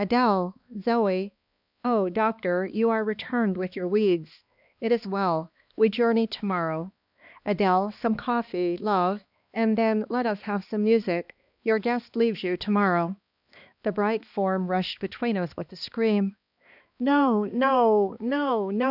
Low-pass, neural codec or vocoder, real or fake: 5.4 kHz; codec, 16 kHz, 2 kbps, X-Codec, HuBERT features, trained on balanced general audio; fake